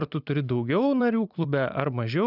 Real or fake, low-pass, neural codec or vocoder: fake; 5.4 kHz; vocoder, 44.1 kHz, 128 mel bands every 256 samples, BigVGAN v2